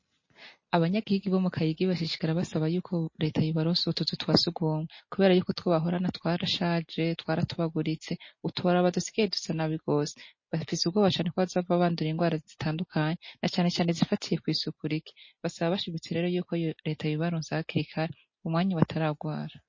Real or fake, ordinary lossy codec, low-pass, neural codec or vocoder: real; MP3, 32 kbps; 7.2 kHz; none